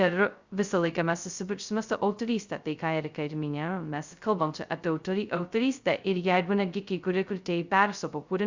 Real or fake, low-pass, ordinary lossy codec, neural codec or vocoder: fake; 7.2 kHz; Opus, 64 kbps; codec, 16 kHz, 0.2 kbps, FocalCodec